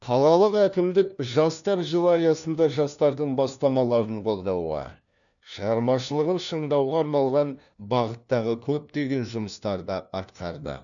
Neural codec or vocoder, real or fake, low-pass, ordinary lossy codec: codec, 16 kHz, 1 kbps, FunCodec, trained on LibriTTS, 50 frames a second; fake; 7.2 kHz; none